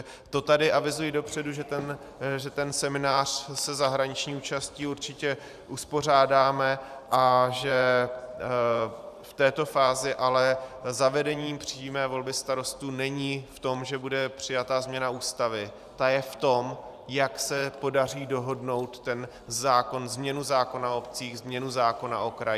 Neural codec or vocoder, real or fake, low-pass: vocoder, 44.1 kHz, 128 mel bands every 512 samples, BigVGAN v2; fake; 14.4 kHz